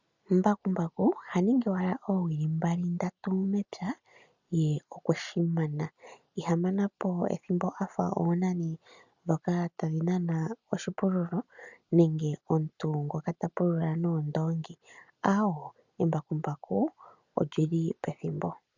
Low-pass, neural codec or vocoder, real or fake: 7.2 kHz; none; real